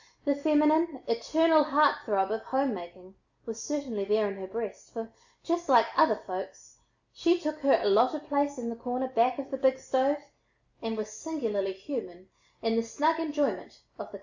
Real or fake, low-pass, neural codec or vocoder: real; 7.2 kHz; none